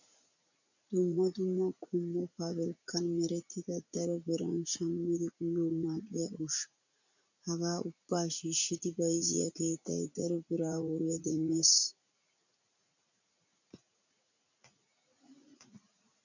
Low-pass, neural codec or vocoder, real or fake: 7.2 kHz; vocoder, 44.1 kHz, 80 mel bands, Vocos; fake